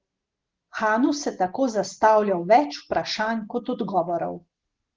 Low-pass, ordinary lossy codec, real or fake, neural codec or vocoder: 7.2 kHz; Opus, 16 kbps; real; none